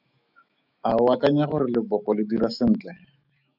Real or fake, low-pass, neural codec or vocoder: fake; 5.4 kHz; autoencoder, 48 kHz, 128 numbers a frame, DAC-VAE, trained on Japanese speech